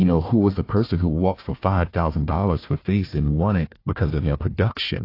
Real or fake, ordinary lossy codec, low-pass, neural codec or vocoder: fake; AAC, 32 kbps; 5.4 kHz; codec, 16 kHz, 1 kbps, FunCodec, trained on Chinese and English, 50 frames a second